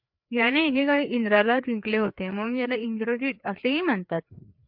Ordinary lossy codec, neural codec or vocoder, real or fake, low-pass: MP3, 48 kbps; codec, 16 kHz, 4 kbps, FreqCodec, larger model; fake; 5.4 kHz